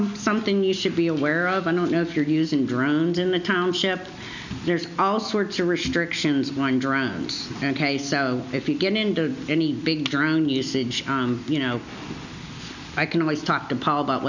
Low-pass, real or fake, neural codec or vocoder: 7.2 kHz; real; none